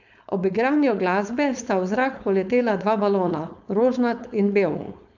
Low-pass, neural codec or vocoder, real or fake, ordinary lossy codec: 7.2 kHz; codec, 16 kHz, 4.8 kbps, FACodec; fake; none